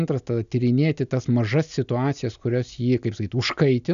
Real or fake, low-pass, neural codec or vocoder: real; 7.2 kHz; none